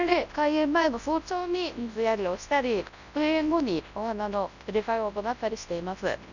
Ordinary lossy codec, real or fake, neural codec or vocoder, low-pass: none; fake; codec, 24 kHz, 0.9 kbps, WavTokenizer, large speech release; 7.2 kHz